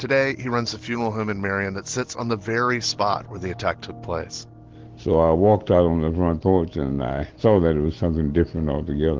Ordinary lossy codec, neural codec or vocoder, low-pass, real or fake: Opus, 16 kbps; none; 7.2 kHz; real